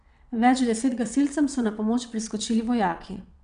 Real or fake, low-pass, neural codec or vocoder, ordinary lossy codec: fake; 9.9 kHz; vocoder, 22.05 kHz, 80 mel bands, WaveNeXt; none